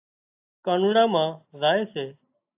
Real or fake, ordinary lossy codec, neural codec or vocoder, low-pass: real; AAC, 32 kbps; none; 3.6 kHz